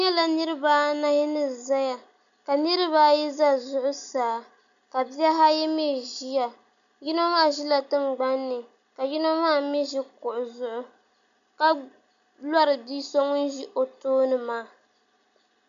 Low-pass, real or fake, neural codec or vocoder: 7.2 kHz; real; none